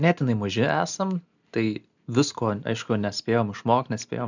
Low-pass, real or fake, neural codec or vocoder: 7.2 kHz; real; none